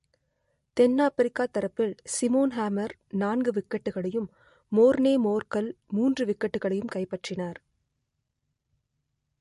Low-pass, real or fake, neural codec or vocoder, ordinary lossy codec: 14.4 kHz; real; none; MP3, 48 kbps